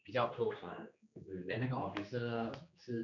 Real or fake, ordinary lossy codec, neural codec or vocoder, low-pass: fake; none; codec, 16 kHz, 2 kbps, X-Codec, HuBERT features, trained on general audio; 7.2 kHz